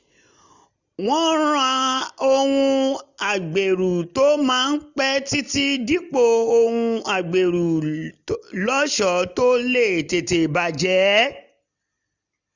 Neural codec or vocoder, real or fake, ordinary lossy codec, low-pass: none; real; none; 7.2 kHz